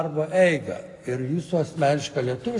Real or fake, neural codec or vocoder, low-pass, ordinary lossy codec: real; none; 10.8 kHz; AAC, 48 kbps